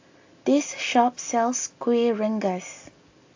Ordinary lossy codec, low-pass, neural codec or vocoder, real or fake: AAC, 48 kbps; 7.2 kHz; none; real